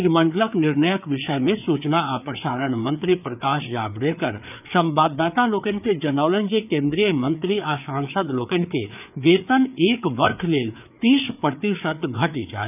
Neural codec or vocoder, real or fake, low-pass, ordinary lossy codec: codec, 16 kHz, 4 kbps, FreqCodec, larger model; fake; 3.6 kHz; none